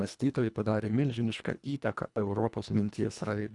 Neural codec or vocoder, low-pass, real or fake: codec, 24 kHz, 1.5 kbps, HILCodec; 10.8 kHz; fake